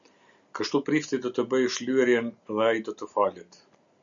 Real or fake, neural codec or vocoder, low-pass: real; none; 7.2 kHz